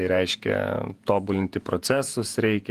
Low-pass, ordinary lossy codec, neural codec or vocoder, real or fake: 14.4 kHz; Opus, 24 kbps; vocoder, 48 kHz, 128 mel bands, Vocos; fake